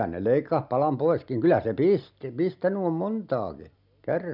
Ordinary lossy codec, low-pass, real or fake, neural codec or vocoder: none; 5.4 kHz; real; none